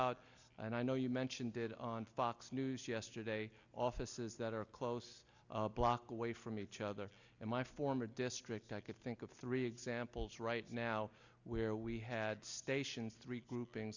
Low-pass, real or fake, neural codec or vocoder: 7.2 kHz; real; none